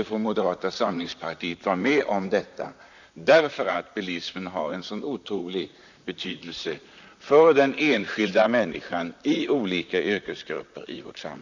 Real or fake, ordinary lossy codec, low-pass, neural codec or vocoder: fake; none; 7.2 kHz; vocoder, 44.1 kHz, 128 mel bands, Pupu-Vocoder